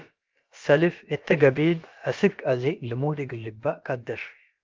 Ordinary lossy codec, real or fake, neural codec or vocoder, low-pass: Opus, 32 kbps; fake; codec, 16 kHz, about 1 kbps, DyCAST, with the encoder's durations; 7.2 kHz